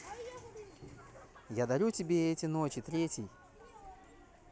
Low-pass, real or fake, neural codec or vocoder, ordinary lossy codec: none; real; none; none